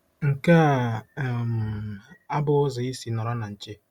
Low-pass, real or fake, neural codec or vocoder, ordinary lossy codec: 19.8 kHz; real; none; Opus, 64 kbps